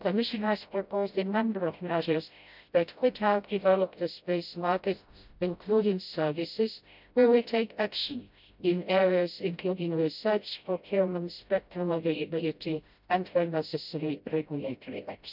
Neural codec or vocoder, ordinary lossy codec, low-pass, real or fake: codec, 16 kHz, 0.5 kbps, FreqCodec, smaller model; none; 5.4 kHz; fake